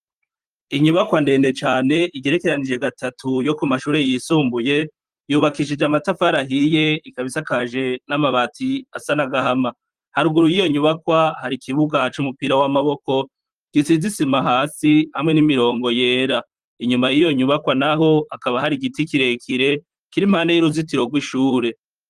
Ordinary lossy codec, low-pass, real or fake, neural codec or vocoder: Opus, 32 kbps; 14.4 kHz; fake; vocoder, 44.1 kHz, 128 mel bands, Pupu-Vocoder